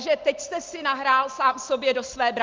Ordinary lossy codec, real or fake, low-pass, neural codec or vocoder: Opus, 24 kbps; real; 7.2 kHz; none